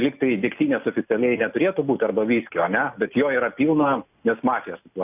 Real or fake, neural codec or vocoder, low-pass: real; none; 3.6 kHz